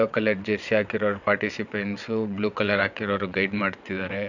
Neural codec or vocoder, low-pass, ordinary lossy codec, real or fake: vocoder, 22.05 kHz, 80 mel bands, WaveNeXt; 7.2 kHz; none; fake